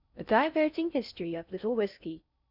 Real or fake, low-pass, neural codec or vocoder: fake; 5.4 kHz; codec, 16 kHz in and 24 kHz out, 0.6 kbps, FocalCodec, streaming, 4096 codes